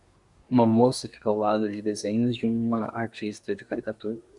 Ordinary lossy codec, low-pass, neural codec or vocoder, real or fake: MP3, 96 kbps; 10.8 kHz; codec, 24 kHz, 1 kbps, SNAC; fake